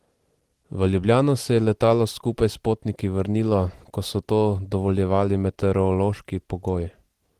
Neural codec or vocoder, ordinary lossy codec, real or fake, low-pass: none; Opus, 16 kbps; real; 14.4 kHz